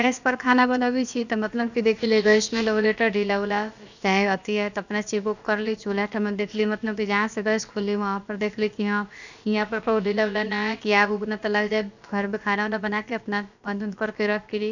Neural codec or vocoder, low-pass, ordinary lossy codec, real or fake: codec, 16 kHz, about 1 kbps, DyCAST, with the encoder's durations; 7.2 kHz; none; fake